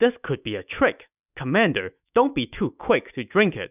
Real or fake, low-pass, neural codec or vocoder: fake; 3.6 kHz; autoencoder, 48 kHz, 128 numbers a frame, DAC-VAE, trained on Japanese speech